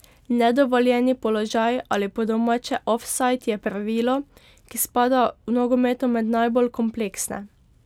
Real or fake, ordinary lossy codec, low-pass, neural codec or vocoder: real; none; 19.8 kHz; none